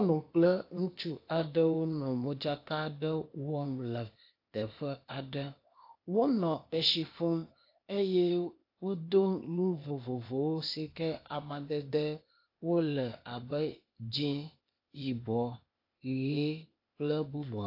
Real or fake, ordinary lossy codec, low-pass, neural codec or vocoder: fake; AAC, 32 kbps; 5.4 kHz; codec, 16 kHz, 0.8 kbps, ZipCodec